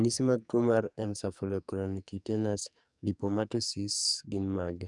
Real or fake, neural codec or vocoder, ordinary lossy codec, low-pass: fake; codec, 44.1 kHz, 2.6 kbps, SNAC; none; 10.8 kHz